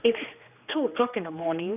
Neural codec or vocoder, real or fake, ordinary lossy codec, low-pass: codec, 16 kHz, 2 kbps, X-Codec, HuBERT features, trained on general audio; fake; none; 3.6 kHz